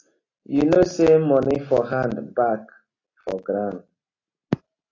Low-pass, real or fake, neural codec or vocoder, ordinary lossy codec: 7.2 kHz; real; none; AAC, 32 kbps